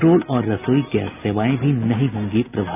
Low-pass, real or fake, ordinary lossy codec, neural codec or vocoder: 3.6 kHz; real; none; none